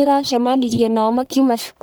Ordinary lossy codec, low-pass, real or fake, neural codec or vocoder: none; none; fake; codec, 44.1 kHz, 1.7 kbps, Pupu-Codec